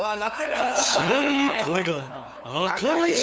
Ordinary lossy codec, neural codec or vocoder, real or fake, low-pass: none; codec, 16 kHz, 2 kbps, FunCodec, trained on LibriTTS, 25 frames a second; fake; none